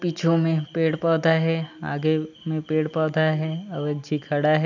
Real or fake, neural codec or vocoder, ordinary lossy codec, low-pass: real; none; none; 7.2 kHz